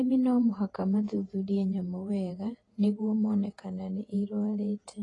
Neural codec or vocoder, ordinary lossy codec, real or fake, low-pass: vocoder, 48 kHz, 128 mel bands, Vocos; AAC, 32 kbps; fake; 10.8 kHz